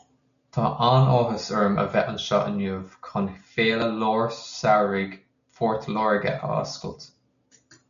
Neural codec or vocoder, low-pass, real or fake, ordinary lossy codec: none; 7.2 kHz; real; MP3, 96 kbps